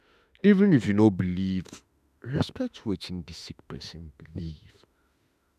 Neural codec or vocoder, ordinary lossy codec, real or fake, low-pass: autoencoder, 48 kHz, 32 numbers a frame, DAC-VAE, trained on Japanese speech; none; fake; 14.4 kHz